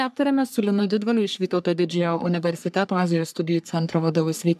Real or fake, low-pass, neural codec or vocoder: fake; 14.4 kHz; codec, 44.1 kHz, 3.4 kbps, Pupu-Codec